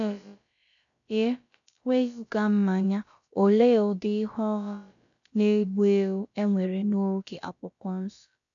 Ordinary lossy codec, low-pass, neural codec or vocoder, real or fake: none; 7.2 kHz; codec, 16 kHz, about 1 kbps, DyCAST, with the encoder's durations; fake